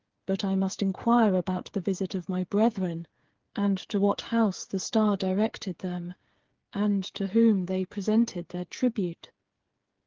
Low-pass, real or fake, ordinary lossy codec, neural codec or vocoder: 7.2 kHz; fake; Opus, 16 kbps; codec, 16 kHz, 8 kbps, FreqCodec, smaller model